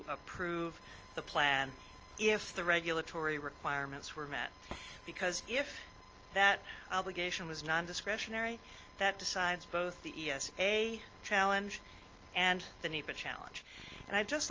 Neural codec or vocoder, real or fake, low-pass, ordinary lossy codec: none; real; 7.2 kHz; Opus, 24 kbps